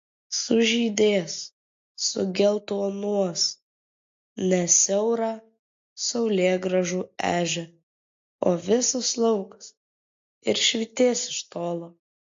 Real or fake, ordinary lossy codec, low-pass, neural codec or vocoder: real; AAC, 48 kbps; 7.2 kHz; none